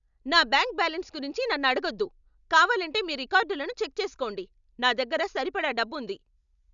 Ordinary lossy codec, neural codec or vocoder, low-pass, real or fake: none; none; 7.2 kHz; real